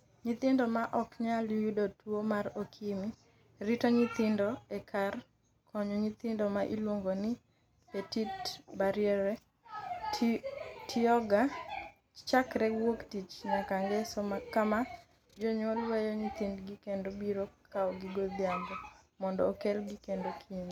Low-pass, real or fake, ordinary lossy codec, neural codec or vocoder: 19.8 kHz; real; none; none